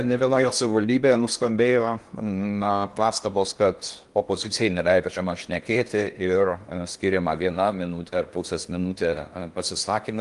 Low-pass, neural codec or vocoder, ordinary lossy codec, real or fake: 10.8 kHz; codec, 16 kHz in and 24 kHz out, 0.8 kbps, FocalCodec, streaming, 65536 codes; Opus, 32 kbps; fake